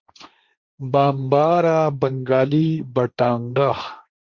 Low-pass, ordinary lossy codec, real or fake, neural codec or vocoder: 7.2 kHz; Opus, 64 kbps; fake; codec, 16 kHz, 1.1 kbps, Voila-Tokenizer